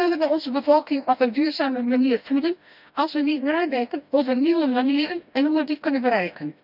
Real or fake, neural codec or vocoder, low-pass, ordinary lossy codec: fake; codec, 16 kHz, 1 kbps, FreqCodec, smaller model; 5.4 kHz; none